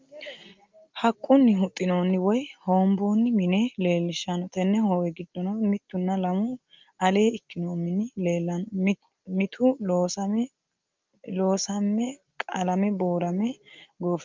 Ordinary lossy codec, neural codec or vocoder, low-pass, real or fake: Opus, 24 kbps; none; 7.2 kHz; real